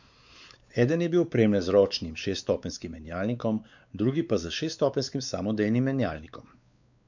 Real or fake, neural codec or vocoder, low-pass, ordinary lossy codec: fake; codec, 16 kHz, 4 kbps, X-Codec, WavLM features, trained on Multilingual LibriSpeech; 7.2 kHz; none